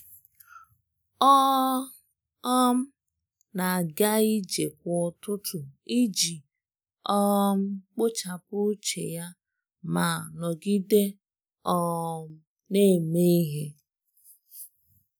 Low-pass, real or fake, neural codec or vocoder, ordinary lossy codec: none; real; none; none